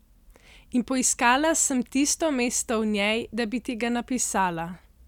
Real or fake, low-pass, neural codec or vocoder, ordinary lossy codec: real; 19.8 kHz; none; none